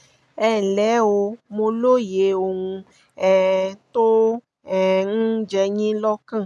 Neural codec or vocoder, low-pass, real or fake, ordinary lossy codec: none; none; real; none